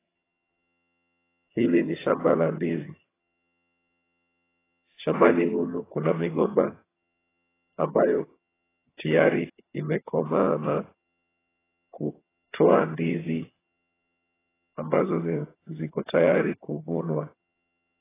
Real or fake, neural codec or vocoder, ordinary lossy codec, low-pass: fake; vocoder, 22.05 kHz, 80 mel bands, HiFi-GAN; AAC, 16 kbps; 3.6 kHz